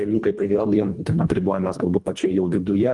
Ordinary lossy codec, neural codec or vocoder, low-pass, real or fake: Opus, 32 kbps; codec, 24 kHz, 1.5 kbps, HILCodec; 10.8 kHz; fake